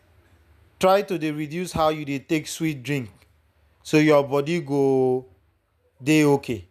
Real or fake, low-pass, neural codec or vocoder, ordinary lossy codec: real; 14.4 kHz; none; none